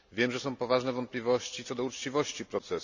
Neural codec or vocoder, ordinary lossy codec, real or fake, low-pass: none; none; real; 7.2 kHz